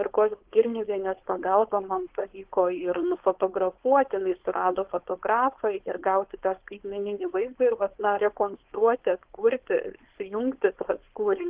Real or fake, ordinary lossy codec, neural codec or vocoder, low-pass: fake; Opus, 16 kbps; codec, 16 kHz, 4.8 kbps, FACodec; 3.6 kHz